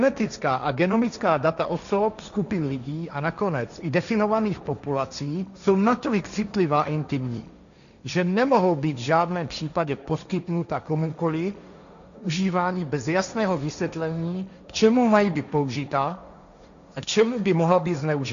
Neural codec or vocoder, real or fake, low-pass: codec, 16 kHz, 1.1 kbps, Voila-Tokenizer; fake; 7.2 kHz